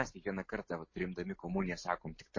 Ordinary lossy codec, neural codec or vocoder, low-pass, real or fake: MP3, 32 kbps; none; 7.2 kHz; real